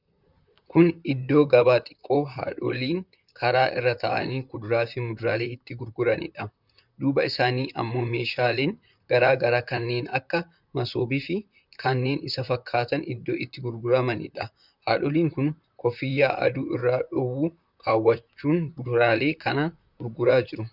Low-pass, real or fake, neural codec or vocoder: 5.4 kHz; fake; vocoder, 44.1 kHz, 128 mel bands, Pupu-Vocoder